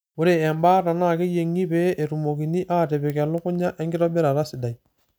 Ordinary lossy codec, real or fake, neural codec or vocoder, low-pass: none; real; none; none